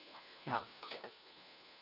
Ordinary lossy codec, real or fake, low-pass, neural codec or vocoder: none; fake; 5.4 kHz; codec, 16 kHz, 1 kbps, FunCodec, trained on LibriTTS, 50 frames a second